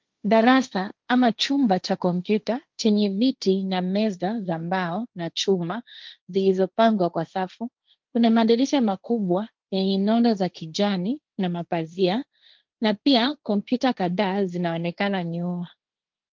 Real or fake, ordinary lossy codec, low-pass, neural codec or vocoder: fake; Opus, 32 kbps; 7.2 kHz; codec, 16 kHz, 1.1 kbps, Voila-Tokenizer